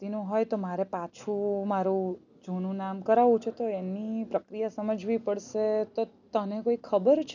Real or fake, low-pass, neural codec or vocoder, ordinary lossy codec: real; 7.2 kHz; none; none